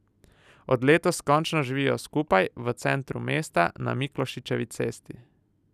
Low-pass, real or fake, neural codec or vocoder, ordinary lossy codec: 14.4 kHz; real; none; none